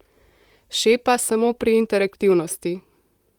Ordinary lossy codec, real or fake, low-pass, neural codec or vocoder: Opus, 32 kbps; fake; 19.8 kHz; vocoder, 44.1 kHz, 128 mel bands, Pupu-Vocoder